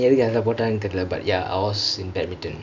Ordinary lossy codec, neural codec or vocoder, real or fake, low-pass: none; none; real; 7.2 kHz